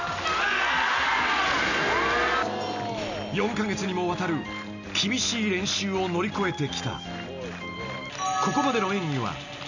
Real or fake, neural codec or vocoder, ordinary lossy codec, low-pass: real; none; none; 7.2 kHz